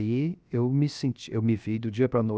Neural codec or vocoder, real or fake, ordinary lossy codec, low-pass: codec, 16 kHz, 0.7 kbps, FocalCodec; fake; none; none